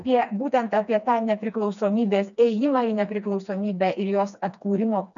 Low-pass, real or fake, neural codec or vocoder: 7.2 kHz; fake; codec, 16 kHz, 2 kbps, FreqCodec, smaller model